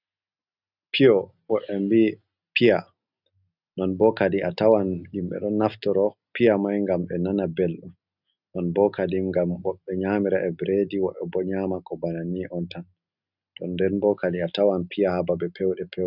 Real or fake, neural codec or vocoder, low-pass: real; none; 5.4 kHz